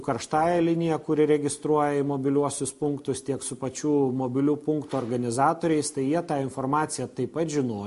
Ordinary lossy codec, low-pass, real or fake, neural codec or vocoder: MP3, 48 kbps; 14.4 kHz; real; none